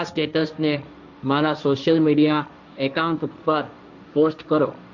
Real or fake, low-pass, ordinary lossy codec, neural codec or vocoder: fake; 7.2 kHz; none; codec, 16 kHz, 1.1 kbps, Voila-Tokenizer